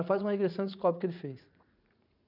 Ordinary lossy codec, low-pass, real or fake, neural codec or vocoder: none; 5.4 kHz; fake; vocoder, 44.1 kHz, 80 mel bands, Vocos